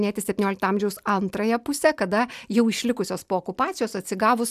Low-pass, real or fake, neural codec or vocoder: 14.4 kHz; real; none